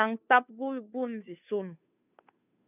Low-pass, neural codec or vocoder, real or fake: 3.6 kHz; codec, 16 kHz, 2 kbps, FunCodec, trained on Chinese and English, 25 frames a second; fake